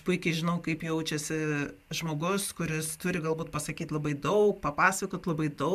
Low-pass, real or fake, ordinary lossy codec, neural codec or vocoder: 14.4 kHz; real; MP3, 96 kbps; none